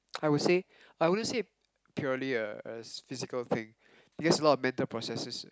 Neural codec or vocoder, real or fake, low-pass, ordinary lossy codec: none; real; none; none